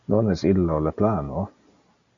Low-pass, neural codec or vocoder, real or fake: 7.2 kHz; none; real